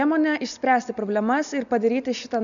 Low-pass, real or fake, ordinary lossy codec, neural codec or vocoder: 7.2 kHz; real; MP3, 96 kbps; none